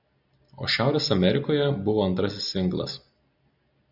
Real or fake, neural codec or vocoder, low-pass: real; none; 5.4 kHz